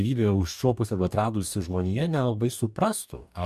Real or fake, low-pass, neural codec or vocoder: fake; 14.4 kHz; codec, 44.1 kHz, 2.6 kbps, DAC